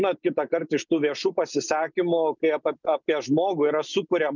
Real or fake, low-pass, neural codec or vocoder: real; 7.2 kHz; none